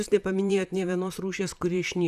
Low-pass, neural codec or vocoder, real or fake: 14.4 kHz; vocoder, 44.1 kHz, 128 mel bands, Pupu-Vocoder; fake